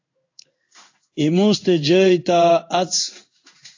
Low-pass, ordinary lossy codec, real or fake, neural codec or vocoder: 7.2 kHz; AAC, 48 kbps; fake; codec, 16 kHz in and 24 kHz out, 1 kbps, XY-Tokenizer